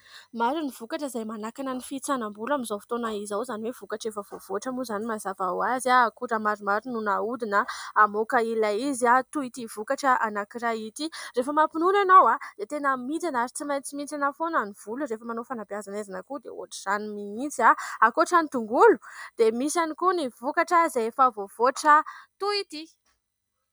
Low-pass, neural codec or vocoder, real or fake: 19.8 kHz; none; real